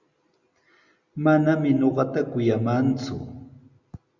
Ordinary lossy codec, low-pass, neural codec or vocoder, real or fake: Opus, 64 kbps; 7.2 kHz; none; real